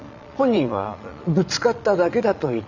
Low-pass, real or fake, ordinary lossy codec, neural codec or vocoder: 7.2 kHz; fake; none; vocoder, 22.05 kHz, 80 mel bands, Vocos